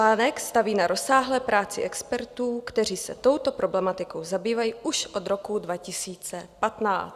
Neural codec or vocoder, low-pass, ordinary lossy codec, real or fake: none; 14.4 kHz; Opus, 64 kbps; real